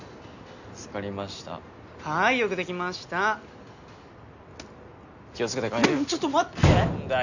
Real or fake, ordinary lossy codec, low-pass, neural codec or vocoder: real; none; 7.2 kHz; none